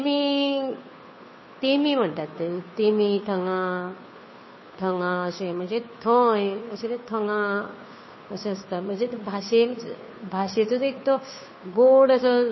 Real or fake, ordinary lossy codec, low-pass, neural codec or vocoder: fake; MP3, 24 kbps; 7.2 kHz; codec, 16 kHz, 2 kbps, FunCodec, trained on Chinese and English, 25 frames a second